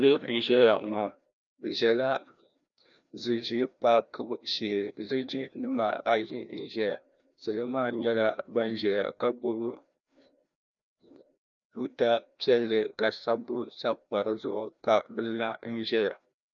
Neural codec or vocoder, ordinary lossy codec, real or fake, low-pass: codec, 16 kHz, 1 kbps, FreqCodec, larger model; MP3, 96 kbps; fake; 7.2 kHz